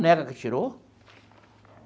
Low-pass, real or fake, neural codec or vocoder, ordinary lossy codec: none; real; none; none